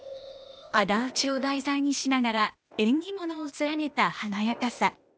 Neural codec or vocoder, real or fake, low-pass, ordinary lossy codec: codec, 16 kHz, 0.8 kbps, ZipCodec; fake; none; none